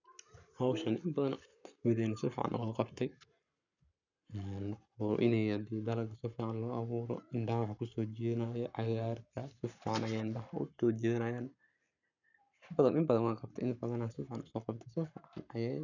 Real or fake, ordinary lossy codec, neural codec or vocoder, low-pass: fake; none; codec, 44.1 kHz, 7.8 kbps, Pupu-Codec; 7.2 kHz